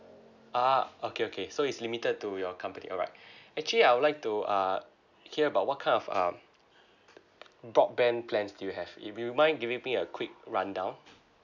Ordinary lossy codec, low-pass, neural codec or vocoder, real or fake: none; 7.2 kHz; none; real